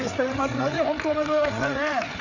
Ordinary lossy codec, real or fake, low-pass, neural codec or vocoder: none; fake; 7.2 kHz; codec, 16 kHz, 8 kbps, FreqCodec, larger model